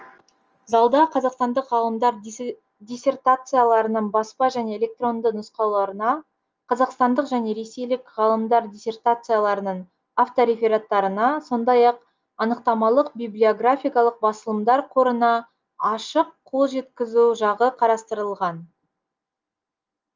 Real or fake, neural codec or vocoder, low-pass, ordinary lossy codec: real; none; 7.2 kHz; Opus, 24 kbps